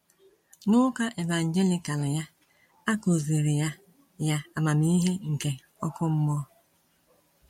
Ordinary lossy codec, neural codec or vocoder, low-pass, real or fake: MP3, 64 kbps; none; 19.8 kHz; real